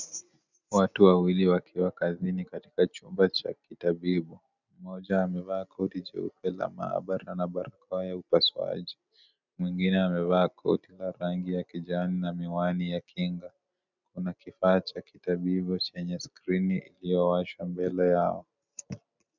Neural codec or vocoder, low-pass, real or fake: none; 7.2 kHz; real